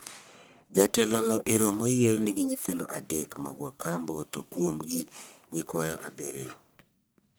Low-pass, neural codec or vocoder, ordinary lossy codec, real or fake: none; codec, 44.1 kHz, 1.7 kbps, Pupu-Codec; none; fake